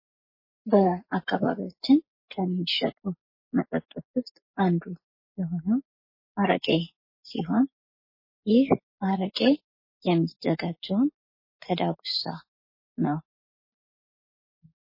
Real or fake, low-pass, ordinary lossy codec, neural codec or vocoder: real; 5.4 kHz; MP3, 24 kbps; none